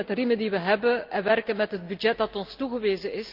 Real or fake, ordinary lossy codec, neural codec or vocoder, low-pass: real; Opus, 24 kbps; none; 5.4 kHz